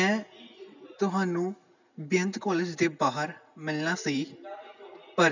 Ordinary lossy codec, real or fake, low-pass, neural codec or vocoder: none; real; 7.2 kHz; none